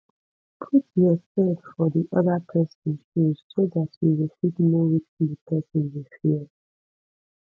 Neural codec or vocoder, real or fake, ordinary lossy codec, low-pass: none; real; none; none